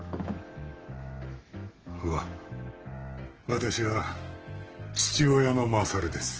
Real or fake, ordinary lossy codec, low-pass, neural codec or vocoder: fake; Opus, 16 kbps; 7.2 kHz; autoencoder, 48 kHz, 128 numbers a frame, DAC-VAE, trained on Japanese speech